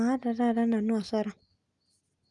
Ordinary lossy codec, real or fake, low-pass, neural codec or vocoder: Opus, 32 kbps; real; 10.8 kHz; none